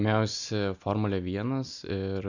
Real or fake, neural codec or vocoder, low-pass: real; none; 7.2 kHz